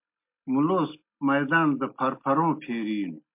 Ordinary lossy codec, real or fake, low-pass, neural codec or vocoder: MP3, 32 kbps; real; 3.6 kHz; none